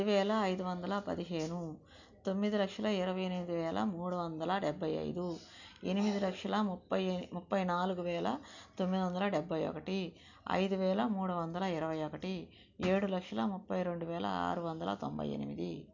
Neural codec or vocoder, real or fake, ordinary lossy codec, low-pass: none; real; none; 7.2 kHz